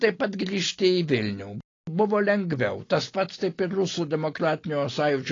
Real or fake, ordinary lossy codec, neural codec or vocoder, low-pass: real; AAC, 32 kbps; none; 7.2 kHz